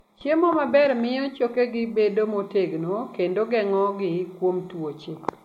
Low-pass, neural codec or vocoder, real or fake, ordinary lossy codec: 19.8 kHz; none; real; MP3, 64 kbps